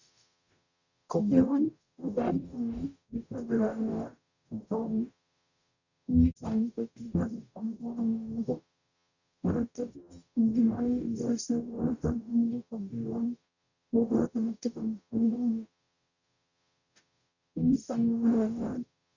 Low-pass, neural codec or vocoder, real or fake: 7.2 kHz; codec, 44.1 kHz, 0.9 kbps, DAC; fake